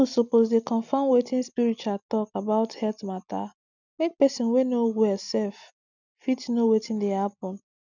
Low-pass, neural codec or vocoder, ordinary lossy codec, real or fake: 7.2 kHz; none; none; real